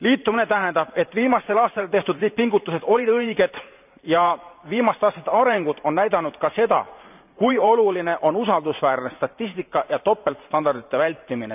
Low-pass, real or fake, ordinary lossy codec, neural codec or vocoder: 3.6 kHz; fake; none; vocoder, 44.1 kHz, 128 mel bands every 512 samples, BigVGAN v2